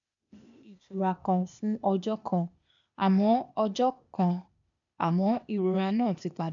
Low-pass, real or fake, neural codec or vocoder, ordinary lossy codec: 7.2 kHz; fake; codec, 16 kHz, 0.8 kbps, ZipCodec; none